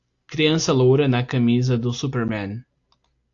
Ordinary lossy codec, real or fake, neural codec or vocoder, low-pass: AAC, 48 kbps; real; none; 7.2 kHz